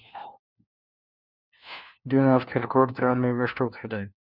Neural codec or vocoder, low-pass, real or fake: codec, 16 kHz, 1 kbps, FunCodec, trained on LibriTTS, 50 frames a second; 5.4 kHz; fake